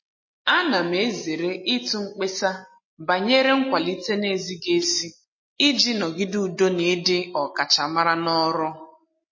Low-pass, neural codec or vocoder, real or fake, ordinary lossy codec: 7.2 kHz; none; real; MP3, 32 kbps